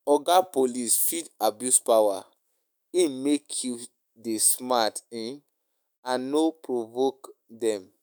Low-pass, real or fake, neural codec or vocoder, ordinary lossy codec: none; fake; autoencoder, 48 kHz, 128 numbers a frame, DAC-VAE, trained on Japanese speech; none